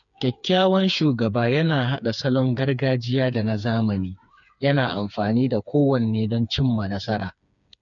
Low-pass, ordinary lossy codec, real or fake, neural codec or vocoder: 7.2 kHz; none; fake; codec, 16 kHz, 4 kbps, FreqCodec, smaller model